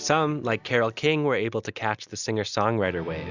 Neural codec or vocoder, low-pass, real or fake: none; 7.2 kHz; real